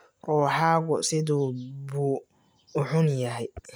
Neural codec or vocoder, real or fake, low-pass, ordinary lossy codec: none; real; none; none